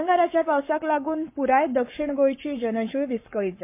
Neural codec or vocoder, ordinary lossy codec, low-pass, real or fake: codec, 44.1 kHz, 7.8 kbps, Pupu-Codec; MP3, 24 kbps; 3.6 kHz; fake